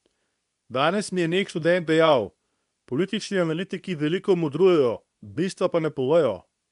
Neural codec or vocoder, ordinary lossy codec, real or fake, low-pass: codec, 24 kHz, 0.9 kbps, WavTokenizer, medium speech release version 2; MP3, 96 kbps; fake; 10.8 kHz